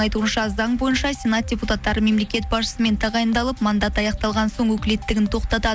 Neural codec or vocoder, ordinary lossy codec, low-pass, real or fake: none; none; none; real